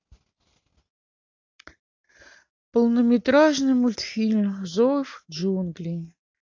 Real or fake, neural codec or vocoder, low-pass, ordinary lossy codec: real; none; 7.2 kHz; AAC, 48 kbps